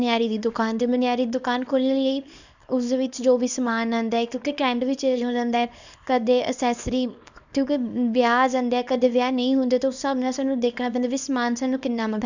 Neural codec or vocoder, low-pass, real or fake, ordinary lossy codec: codec, 24 kHz, 0.9 kbps, WavTokenizer, small release; 7.2 kHz; fake; none